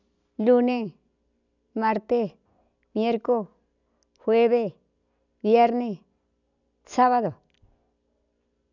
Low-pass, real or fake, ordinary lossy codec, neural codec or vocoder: 7.2 kHz; real; none; none